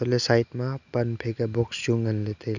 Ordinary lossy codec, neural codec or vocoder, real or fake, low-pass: none; none; real; 7.2 kHz